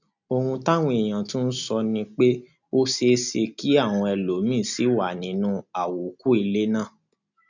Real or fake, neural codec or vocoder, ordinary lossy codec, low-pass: real; none; none; 7.2 kHz